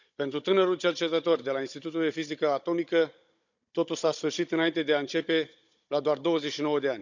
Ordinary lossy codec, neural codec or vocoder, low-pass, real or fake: none; codec, 16 kHz, 16 kbps, FunCodec, trained on Chinese and English, 50 frames a second; 7.2 kHz; fake